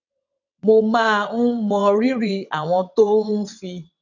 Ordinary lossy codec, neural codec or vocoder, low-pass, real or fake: none; vocoder, 22.05 kHz, 80 mel bands, WaveNeXt; 7.2 kHz; fake